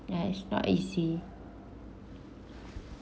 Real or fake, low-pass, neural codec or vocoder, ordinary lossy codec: real; none; none; none